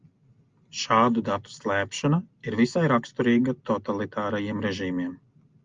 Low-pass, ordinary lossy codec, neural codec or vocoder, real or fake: 7.2 kHz; Opus, 32 kbps; none; real